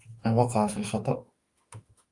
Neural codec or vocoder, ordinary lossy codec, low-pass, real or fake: autoencoder, 48 kHz, 32 numbers a frame, DAC-VAE, trained on Japanese speech; Opus, 32 kbps; 10.8 kHz; fake